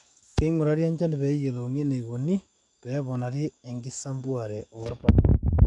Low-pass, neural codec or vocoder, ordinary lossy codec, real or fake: 10.8 kHz; codec, 44.1 kHz, 7.8 kbps, DAC; none; fake